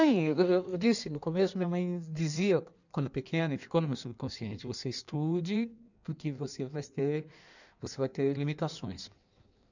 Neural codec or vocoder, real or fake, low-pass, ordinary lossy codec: codec, 16 kHz in and 24 kHz out, 1.1 kbps, FireRedTTS-2 codec; fake; 7.2 kHz; none